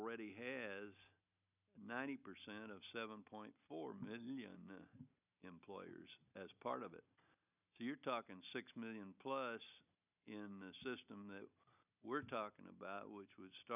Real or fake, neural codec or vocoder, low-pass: real; none; 3.6 kHz